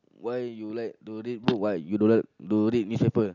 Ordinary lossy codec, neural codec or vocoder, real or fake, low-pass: none; none; real; 7.2 kHz